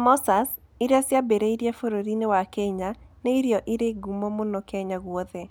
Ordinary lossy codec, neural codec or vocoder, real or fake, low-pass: none; none; real; none